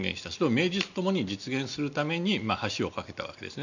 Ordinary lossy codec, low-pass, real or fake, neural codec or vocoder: none; 7.2 kHz; real; none